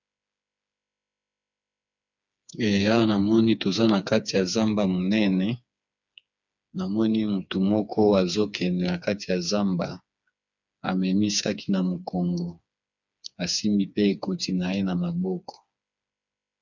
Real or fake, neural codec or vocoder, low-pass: fake; codec, 16 kHz, 4 kbps, FreqCodec, smaller model; 7.2 kHz